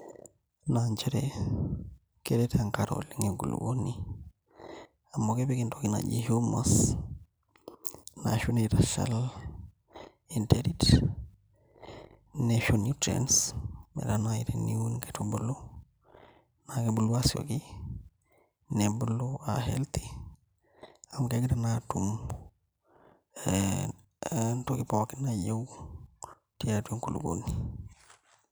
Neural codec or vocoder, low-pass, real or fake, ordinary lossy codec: none; none; real; none